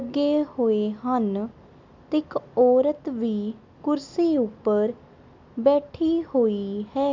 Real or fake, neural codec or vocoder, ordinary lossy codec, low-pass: real; none; MP3, 64 kbps; 7.2 kHz